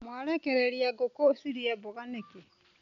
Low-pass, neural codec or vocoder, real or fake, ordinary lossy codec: 7.2 kHz; none; real; none